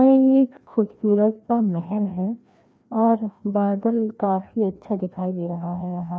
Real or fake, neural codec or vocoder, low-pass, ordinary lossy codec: fake; codec, 16 kHz, 1 kbps, FreqCodec, larger model; none; none